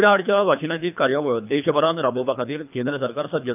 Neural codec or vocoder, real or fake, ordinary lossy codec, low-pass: codec, 24 kHz, 3 kbps, HILCodec; fake; none; 3.6 kHz